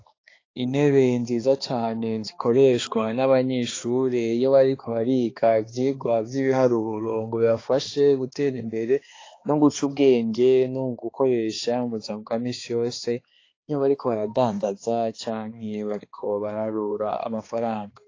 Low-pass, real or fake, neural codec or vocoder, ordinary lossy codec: 7.2 kHz; fake; codec, 16 kHz, 2 kbps, X-Codec, HuBERT features, trained on balanced general audio; AAC, 32 kbps